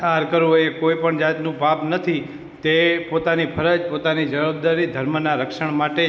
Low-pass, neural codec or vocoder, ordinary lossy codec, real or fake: none; none; none; real